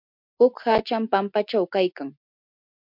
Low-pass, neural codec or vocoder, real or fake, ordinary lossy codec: 5.4 kHz; none; real; MP3, 48 kbps